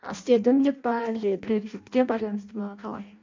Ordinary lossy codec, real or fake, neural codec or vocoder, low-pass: MP3, 64 kbps; fake; codec, 16 kHz in and 24 kHz out, 0.6 kbps, FireRedTTS-2 codec; 7.2 kHz